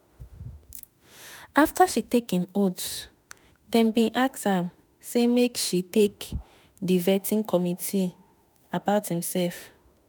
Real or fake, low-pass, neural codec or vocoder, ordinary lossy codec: fake; none; autoencoder, 48 kHz, 32 numbers a frame, DAC-VAE, trained on Japanese speech; none